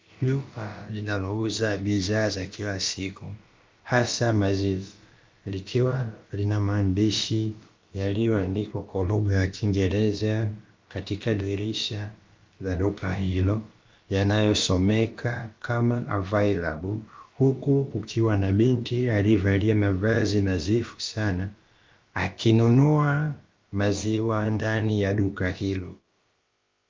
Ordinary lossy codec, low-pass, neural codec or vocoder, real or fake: Opus, 24 kbps; 7.2 kHz; codec, 16 kHz, about 1 kbps, DyCAST, with the encoder's durations; fake